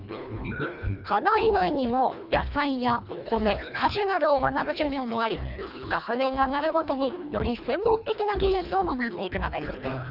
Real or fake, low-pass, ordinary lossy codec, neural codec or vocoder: fake; 5.4 kHz; none; codec, 24 kHz, 1.5 kbps, HILCodec